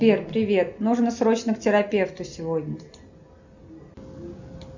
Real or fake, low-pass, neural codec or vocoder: real; 7.2 kHz; none